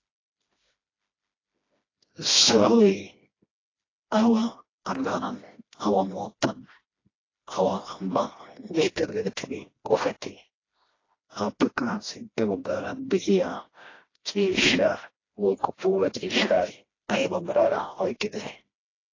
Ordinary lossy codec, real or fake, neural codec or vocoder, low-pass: AAC, 32 kbps; fake; codec, 16 kHz, 1 kbps, FreqCodec, smaller model; 7.2 kHz